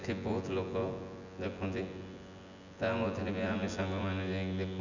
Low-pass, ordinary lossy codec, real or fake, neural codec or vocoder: 7.2 kHz; none; fake; vocoder, 24 kHz, 100 mel bands, Vocos